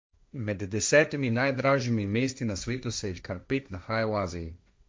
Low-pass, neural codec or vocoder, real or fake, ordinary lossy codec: none; codec, 16 kHz, 1.1 kbps, Voila-Tokenizer; fake; none